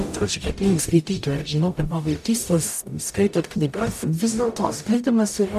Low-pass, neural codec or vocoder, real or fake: 14.4 kHz; codec, 44.1 kHz, 0.9 kbps, DAC; fake